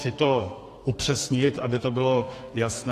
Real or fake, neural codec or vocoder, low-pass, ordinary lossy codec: fake; codec, 32 kHz, 1.9 kbps, SNAC; 14.4 kHz; AAC, 48 kbps